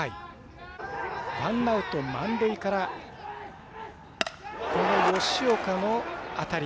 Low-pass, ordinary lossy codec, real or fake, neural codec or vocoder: none; none; real; none